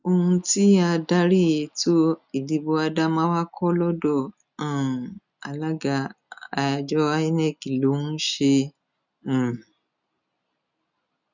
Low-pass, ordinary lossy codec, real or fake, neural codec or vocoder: 7.2 kHz; none; real; none